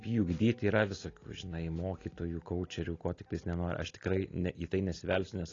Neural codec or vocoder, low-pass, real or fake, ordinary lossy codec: none; 7.2 kHz; real; AAC, 32 kbps